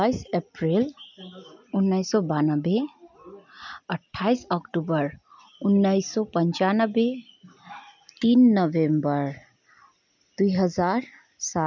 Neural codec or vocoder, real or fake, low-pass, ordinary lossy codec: none; real; 7.2 kHz; none